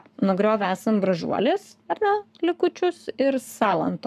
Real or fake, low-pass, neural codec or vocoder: fake; 14.4 kHz; codec, 44.1 kHz, 7.8 kbps, Pupu-Codec